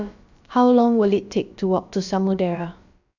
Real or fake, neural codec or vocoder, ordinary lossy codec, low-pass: fake; codec, 16 kHz, about 1 kbps, DyCAST, with the encoder's durations; none; 7.2 kHz